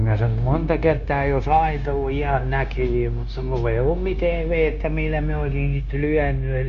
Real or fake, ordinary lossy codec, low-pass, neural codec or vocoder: fake; none; 7.2 kHz; codec, 16 kHz, 0.9 kbps, LongCat-Audio-Codec